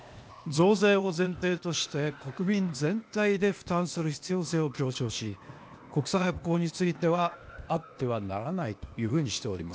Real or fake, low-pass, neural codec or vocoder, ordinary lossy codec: fake; none; codec, 16 kHz, 0.8 kbps, ZipCodec; none